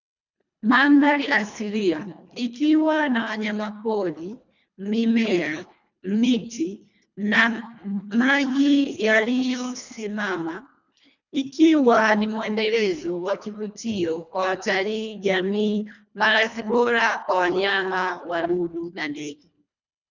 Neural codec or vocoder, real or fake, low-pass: codec, 24 kHz, 1.5 kbps, HILCodec; fake; 7.2 kHz